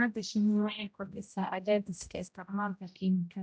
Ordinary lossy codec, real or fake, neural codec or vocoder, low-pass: none; fake; codec, 16 kHz, 0.5 kbps, X-Codec, HuBERT features, trained on general audio; none